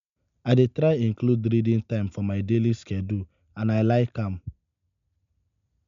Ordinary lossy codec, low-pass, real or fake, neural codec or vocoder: MP3, 64 kbps; 7.2 kHz; real; none